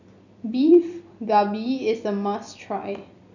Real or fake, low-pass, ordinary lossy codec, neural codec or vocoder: fake; 7.2 kHz; none; vocoder, 44.1 kHz, 128 mel bands every 256 samples, BigVGAN v2